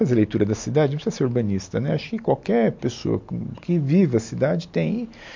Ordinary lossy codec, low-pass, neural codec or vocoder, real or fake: MP3, 48 kbps; 7.2 kHz; none; real